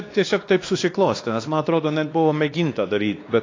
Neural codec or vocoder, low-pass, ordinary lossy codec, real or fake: codec, 16 kHz, 2 kbps, X-Codec, WavLM features, trained on Multilingual LibriSpeech; 7.2 kHz; AAC, 32 kbps; fake